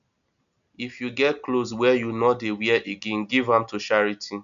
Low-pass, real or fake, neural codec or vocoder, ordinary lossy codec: 7.2 kHz; real; none; none